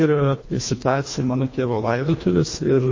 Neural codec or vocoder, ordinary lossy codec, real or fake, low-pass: codec, 24 kHz, 1.5 kbps, HILCodec; MP3, 32 kbps; fake; 7.2 kHz